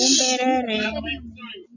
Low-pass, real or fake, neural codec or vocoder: 7.2 kHz; real; none